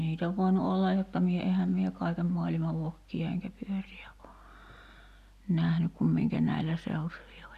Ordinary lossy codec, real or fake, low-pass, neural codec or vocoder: none; real; 14.4 kHz; none